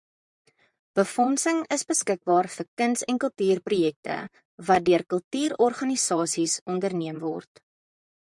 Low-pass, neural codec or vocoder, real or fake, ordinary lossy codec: 10.8 kHz; vocoder, 44.1 kHz, 128 mel bands, Pupu-Vocoder; fake; MP3, 96 kbps